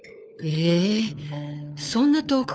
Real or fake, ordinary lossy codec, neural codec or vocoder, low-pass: fake; none; codec, 16 kHz, 4 kbps, FunCodec, trained on LibriTTS, 50 frames a second; none